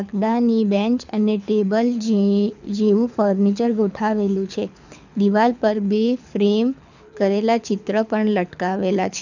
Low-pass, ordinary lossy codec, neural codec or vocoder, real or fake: 7.2 kHz; none; codec, 24 kHz, 6 kbps, HILCodec; fake